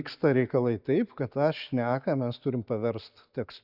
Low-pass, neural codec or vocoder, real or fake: 5.4 kHz; none; real